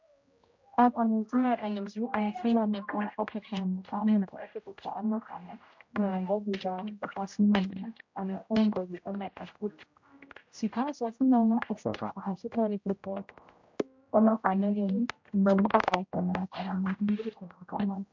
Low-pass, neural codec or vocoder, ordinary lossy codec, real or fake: 7.2 kHz; codec, 16 kHz, 0.5 kbps, X-Codec, HuBERT features, trained on general audio; MP3, 48 kbps; fake